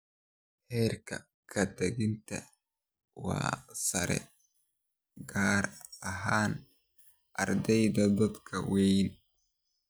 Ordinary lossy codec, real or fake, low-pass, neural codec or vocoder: none; real; none; none